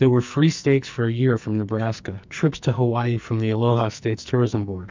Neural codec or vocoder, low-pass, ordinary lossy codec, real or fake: codec, 44.1 kHz, 2.6 kbps, SNAC; 7.2 kHz; MP3, 64 kbps; fake